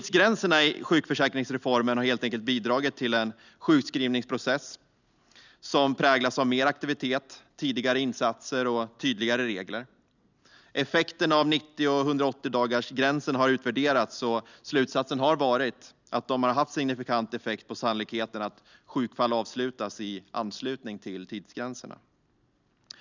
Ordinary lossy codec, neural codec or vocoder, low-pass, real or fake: none; none; 7.2 kHz; real